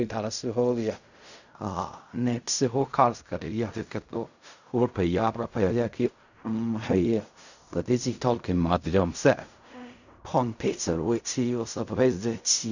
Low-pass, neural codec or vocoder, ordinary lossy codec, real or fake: 7.2 kHz; codec, 16 kHz in and 24 kHz out, 0.4 kbps, LongCat-Audio-Codec, fine tuned four codebook decoder; none; fake